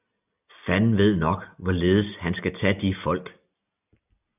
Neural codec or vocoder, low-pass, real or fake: none; 3.6 kHz; real